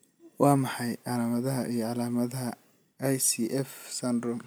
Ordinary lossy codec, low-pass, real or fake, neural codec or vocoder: none; none; real; none